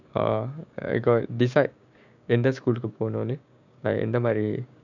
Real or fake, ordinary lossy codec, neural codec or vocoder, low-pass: fake; none; codec, 16 kHz, 6 kbps, DAC; 7.2 kHz